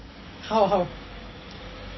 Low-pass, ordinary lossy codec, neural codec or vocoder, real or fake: 7.2 kHz; MP3, 24 kbps; none; real